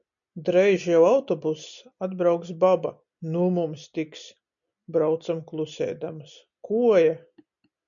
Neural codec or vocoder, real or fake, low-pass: none; real; 7.2 kHz